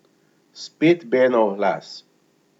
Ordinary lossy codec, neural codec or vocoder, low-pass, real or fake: none; none; 19.8 kHz; real